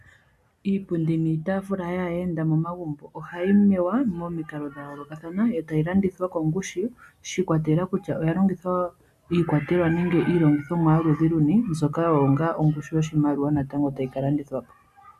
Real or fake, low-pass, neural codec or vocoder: real; 14.4 kHz; none